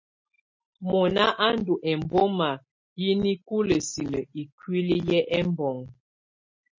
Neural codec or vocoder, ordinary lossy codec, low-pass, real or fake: none; MP3, 32 kbps; 7.2 kHz; real